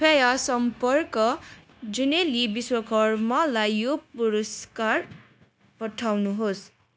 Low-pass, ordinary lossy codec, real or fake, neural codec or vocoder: none; none; fake; codec, 16 kHz, 0.9 kbps, LongCat-Audio-Codec